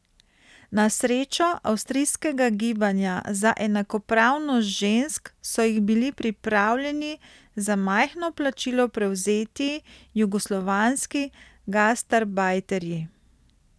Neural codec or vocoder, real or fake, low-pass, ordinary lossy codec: none; real; none; none